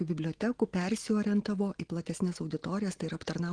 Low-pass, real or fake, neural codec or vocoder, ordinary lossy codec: 9.9 kHz; real; none; Opus, 16 kbps